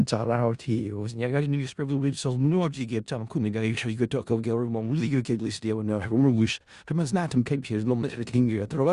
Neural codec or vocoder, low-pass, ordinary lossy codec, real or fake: codec, 16 kHz in and 24 kHz out, 0.4 kbps, LongCat-Audio-Codec, four codebook decoder; 10.8 kHz; Opus, 64 kbps; fake